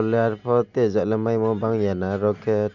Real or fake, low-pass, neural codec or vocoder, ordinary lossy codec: real; 7.2 kHz; none; none